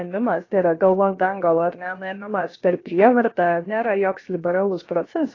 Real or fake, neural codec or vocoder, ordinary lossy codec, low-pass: fake; codec, 16 kHz, about 1 kbps, DyCAST, with the encoder's durations; AAC, 32 kbps; 7.2 kHz